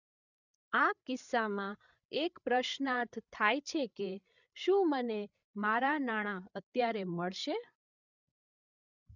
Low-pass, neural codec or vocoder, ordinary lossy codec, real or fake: 7.2 kHz; codec, 16 kHz, 8 kbps, FreqCodec, larger model; none; fake